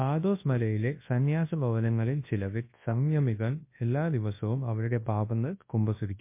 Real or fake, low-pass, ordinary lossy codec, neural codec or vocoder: fake; 3.6 kHz; MP3, 24 kbps; codec, 24 kHz, 0.9 kbps, WavTokenizer, large speech release